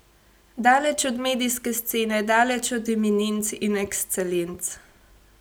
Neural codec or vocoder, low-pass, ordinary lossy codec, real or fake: none; none; none; real